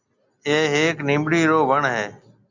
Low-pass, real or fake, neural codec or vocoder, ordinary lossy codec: 7.2 kHz; real; none; Opus, 64 kbps